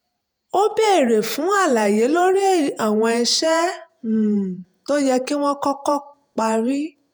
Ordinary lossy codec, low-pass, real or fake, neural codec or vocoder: none; none; fake; vocoder, 48 kHz, 128 mel bands, Vocos